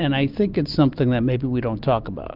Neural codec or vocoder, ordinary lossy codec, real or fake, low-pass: none; Opus, 32 kbps; real; 5.4 kHz